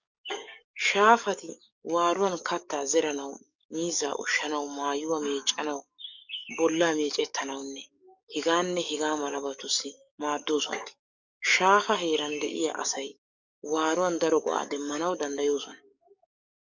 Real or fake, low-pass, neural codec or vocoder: fake; 7.2 kHz; codec, 44.1 kHz, 7.8 kbps, DAC